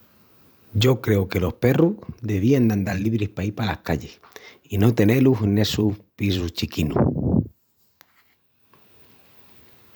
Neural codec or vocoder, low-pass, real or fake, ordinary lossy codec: vocoder, 44.1 kHz, 128 mel bands every 512 samples, BigVGAN v2; none; fake; none